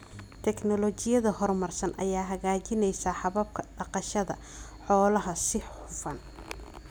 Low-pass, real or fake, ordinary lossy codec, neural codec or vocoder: none; real; none; none